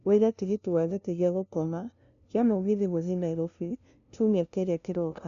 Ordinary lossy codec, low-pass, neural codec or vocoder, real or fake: none; 7.2 kHz; codec, 16 kHz, 0.5 kbps, FunCodec, trained on LibriTTS, 25 frames a second; fake